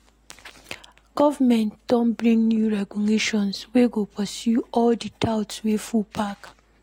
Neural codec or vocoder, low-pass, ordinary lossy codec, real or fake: none; 19.8 kHz; AAC, 48 kbps; real